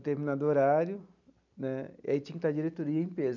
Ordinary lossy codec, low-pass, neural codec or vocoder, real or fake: none; 7.2 kHz; none; real